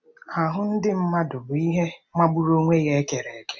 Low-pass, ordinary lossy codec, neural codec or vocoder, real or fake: none; none; none; real